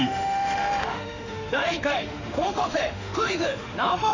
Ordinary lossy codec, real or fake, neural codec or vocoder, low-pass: none; fake; autoencoder, 48 kHz, 32 numbers a frame, DAC-VAE, trained on Japanese speech; 7.2 kHz